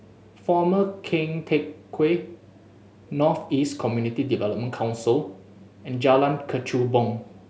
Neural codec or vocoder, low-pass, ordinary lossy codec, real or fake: none; none; none; real